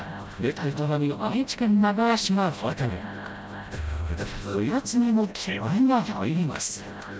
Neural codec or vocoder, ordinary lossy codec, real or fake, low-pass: codec, 16 kHz, 0.5 kbps, FreqCodec, smaller model; none; fake; none